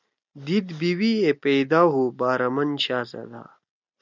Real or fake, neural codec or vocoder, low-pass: real; none; 7.2 kHz